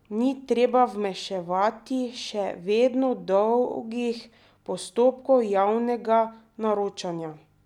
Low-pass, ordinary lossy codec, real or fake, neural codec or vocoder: 19.8 kHz; none; real; none